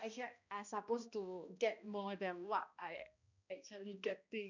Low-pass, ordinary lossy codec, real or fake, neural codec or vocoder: 7.2 kHz; none; fake; codec, 16 kHz, 1 kbps, X-Codec, HuBERT features, trained on balanced general audio